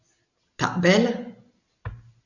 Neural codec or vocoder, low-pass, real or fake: none; 7.2 kHz; real